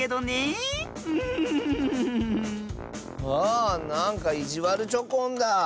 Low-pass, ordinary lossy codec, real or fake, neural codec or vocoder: none; none; real; none